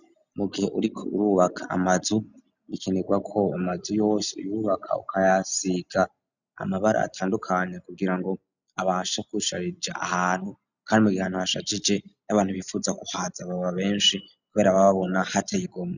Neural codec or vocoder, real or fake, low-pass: none; real; 7.2 kHz